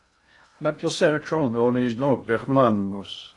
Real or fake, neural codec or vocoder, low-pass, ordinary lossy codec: fake; codec, 16 kHz in and 24 kHz out, 0.6 kbps, FocalCodec, streaming, 2048 codes; 10.8 kHz; AAC, 48 kbps